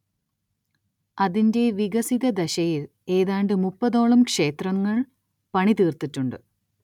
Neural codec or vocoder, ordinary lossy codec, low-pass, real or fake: none; none; 19.8 kHz; real